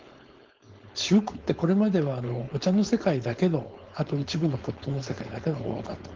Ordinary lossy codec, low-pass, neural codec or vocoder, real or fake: Opus, 16 kbps; 7.2 kHz; codec, 16 kHz, 4.8 kbps, FACodec; fake